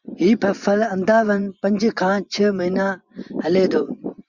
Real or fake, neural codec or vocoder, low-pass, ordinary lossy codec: fake; vocoder, 24 kHz, 100 mel bands, Vocos; 7.2 kHz; Opus, 64 kbps